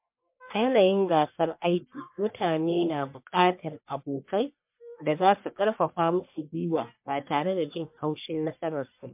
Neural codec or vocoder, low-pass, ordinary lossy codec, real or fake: codec, 44.1 kHz, 1.7 kbps, Pupu-Codec; 3.6 kHz; MP3, 32 kbps; fake